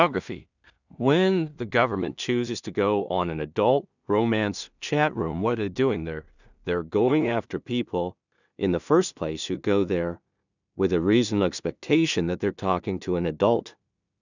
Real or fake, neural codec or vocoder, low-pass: fake; codec, 16 kHz in and 24 kHz out, 0.4 kbps, LongCat-Audio-Codec, two codebook decoder; 7.2 kHz